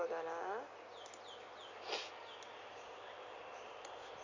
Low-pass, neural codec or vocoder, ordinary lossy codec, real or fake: 7.2 kHz; none; MP3, 48 kbps; real